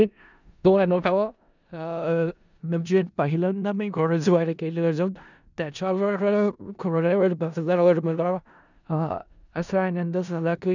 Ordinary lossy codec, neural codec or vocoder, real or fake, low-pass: none; codec, 16 kHz in and 24 kHz out, 0.4 kbps, LongCat-Audio-Codec, four codebook decoder; fake; 7.2 kHz